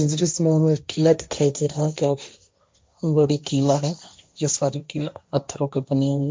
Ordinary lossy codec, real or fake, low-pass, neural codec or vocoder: none; fake; none; codec, 16 kHz, 1.1 kbps, Voila-Tokenizer